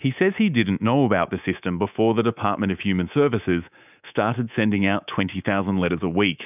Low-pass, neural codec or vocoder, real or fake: 3.6 kHz; codec, 24 kHz, 3.1 kbps, DualCodec; fake